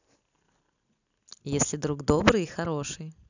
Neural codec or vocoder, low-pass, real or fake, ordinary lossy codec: none; 7.2 kHz; real; none